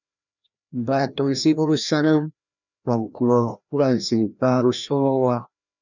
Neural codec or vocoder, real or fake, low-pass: codec, 16 kHz, 1 kbps, FreqCodec, larger model; fake; 7.2 kHz